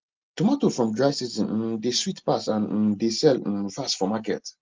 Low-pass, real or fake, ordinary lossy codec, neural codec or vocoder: 7.2 kHz; real; Opus, 32 kbps; none